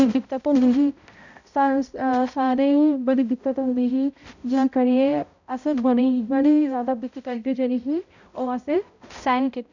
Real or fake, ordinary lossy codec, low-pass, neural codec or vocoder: fake; none; 7.2 kHz; codec, 16 kHz, 0.5 kbps, X-Codec, HuBERT features, trained on balanced general audio